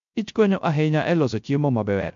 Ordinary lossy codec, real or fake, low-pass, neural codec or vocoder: MP3, 48 kbps; fake; 7.2 kHz; codec, 16 kHz, 0.3 kbps, FocalCodec